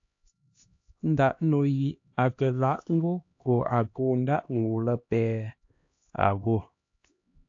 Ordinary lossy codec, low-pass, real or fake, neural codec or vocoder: AAC, 48 kbps; 7.2 kHz; fake; codec, 16 kHz, 1 kbps, X-Codec, HuBERT features, trained on LibriSpeech